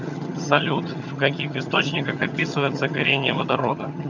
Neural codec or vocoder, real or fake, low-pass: vocoder, 22.05 kHz, 80 mel bands, HiFi-GAN; fake; 7.2 kHz